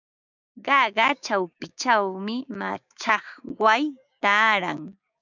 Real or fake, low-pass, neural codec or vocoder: fake; 7.2 kHz; codec, 16 kHz, 6 kbps, DAC